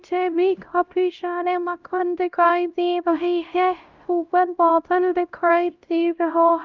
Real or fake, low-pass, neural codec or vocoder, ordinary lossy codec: fake; 7.2 kHz; codec, 16 kHz, 0.5 kbps, X-Codec, HuBERT features, trained on LibriSpeech; Opus, 32 kbps